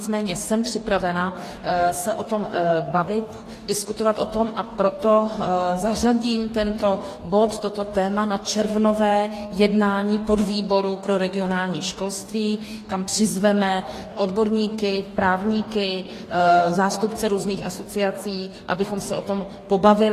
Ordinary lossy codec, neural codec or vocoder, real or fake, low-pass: AAC, 48 kbps; codec, 44.1 kHz, 2.6 kbps, DAC; fake; 14.4 kHz